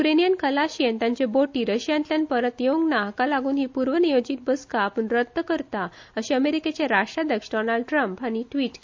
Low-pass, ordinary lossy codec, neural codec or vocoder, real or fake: 7.2 kHz; MP3, 48 kbps; none; real